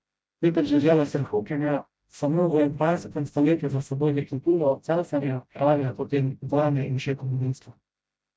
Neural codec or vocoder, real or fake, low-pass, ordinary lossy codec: codec, 16 kHz, 0.5 kbps, FreqCodec, smaller model; fake; none; none